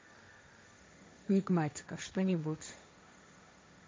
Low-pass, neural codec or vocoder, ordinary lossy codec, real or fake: none; codec, 16 kHz, 1.1 kbps, Voila-Tokenizer; none; fake